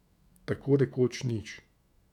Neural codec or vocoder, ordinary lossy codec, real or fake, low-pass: autoencoder, 48 kHz, 128 numbers a frame, DAC-VAE, trained on Japanese speech; none; fake; 19.8 kHz